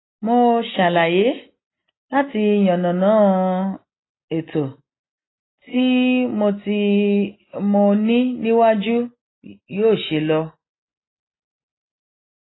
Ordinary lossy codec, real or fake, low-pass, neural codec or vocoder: AAC, 16 kbps; real; 7.2 kHz; none